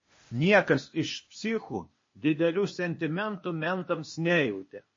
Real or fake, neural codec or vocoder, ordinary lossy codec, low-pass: fake; codec, 16 kHz, 0.8 kbps, ZipCodec; MP3, 32 kbps; 7.2 kHz